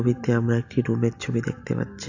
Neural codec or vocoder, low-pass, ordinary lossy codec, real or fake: none; 7.2 kHz; AAC, 48 kbps; real